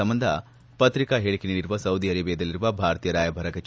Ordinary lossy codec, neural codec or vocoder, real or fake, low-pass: none; none; real; none